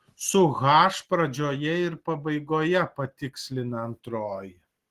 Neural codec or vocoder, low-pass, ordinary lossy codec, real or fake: none; 14.4 kHz; Opus, 16 kbps; real